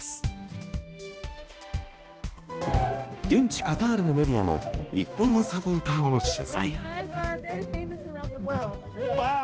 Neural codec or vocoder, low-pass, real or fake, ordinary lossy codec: codec, 16 kHz, 1 kbps, X-Codec, HuBERT features, trained on balanced general audio; none; fake; none